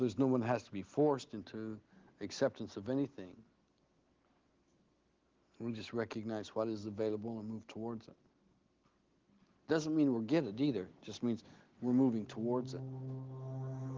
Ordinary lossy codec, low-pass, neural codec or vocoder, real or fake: Opus, 16 kbps; 7.2 kHz; none; real